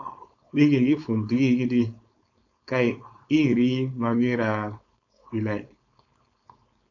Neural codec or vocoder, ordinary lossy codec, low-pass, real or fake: codec, 16 kHz, 4.8 kbps, FACodec; AAC, 48 kbps; 7.2 kHz; fake